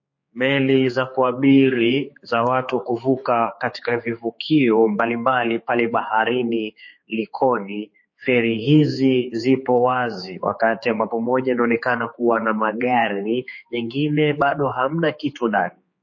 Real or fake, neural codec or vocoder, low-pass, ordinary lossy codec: fake; codec, 16 kHz, 4 kbps, X-Codec, HuBERT features, trained on general audio; 7.2 kHz; MP3, 32 kbps